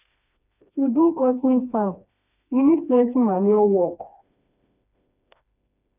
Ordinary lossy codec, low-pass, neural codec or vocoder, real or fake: none; 3.6 kHz; codec, 16 kHz, 2 kbps, FreqCodec, smaller model; fake